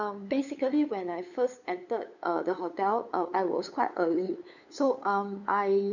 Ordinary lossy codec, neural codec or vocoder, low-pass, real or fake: none; codec, 16 kHz, 8 kbps, FunCodec, trained on LibriTTS, 25 frames a second; 7.2 kHz; fake